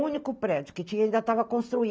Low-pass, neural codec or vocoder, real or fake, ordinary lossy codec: none; none; real; none